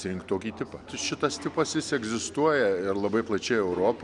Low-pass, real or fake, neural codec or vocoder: 10.8 kHz; real; none